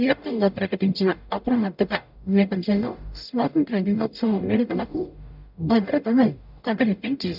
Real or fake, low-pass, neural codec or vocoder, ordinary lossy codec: fake; 5.4 kHz; codec, 44.1 kHz, 0.9 kbps, DAC; none